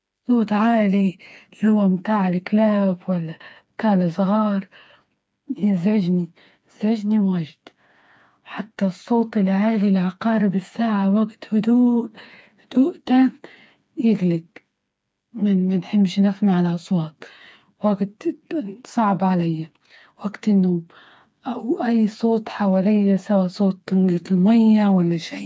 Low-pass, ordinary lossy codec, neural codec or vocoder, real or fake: none; none; codec, 16 kHz, 4 kbps, FreqCodec, smaller model; fake